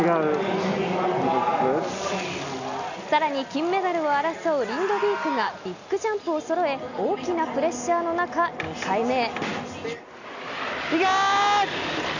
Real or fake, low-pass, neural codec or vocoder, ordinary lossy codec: real; 7.2 kHz; none; none